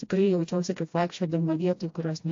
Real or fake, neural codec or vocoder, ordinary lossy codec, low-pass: fake; codec, 16 kHz, 1 kbps, FreqCodec, smaller model; AAC, 48 kbps; 7.2 kHz